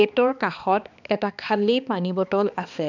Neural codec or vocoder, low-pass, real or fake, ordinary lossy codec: codec, 16 kHz, 2 kbps, X-Codec, HuBERT features, trained on balanced general audio; 7.2 kHz; fake; none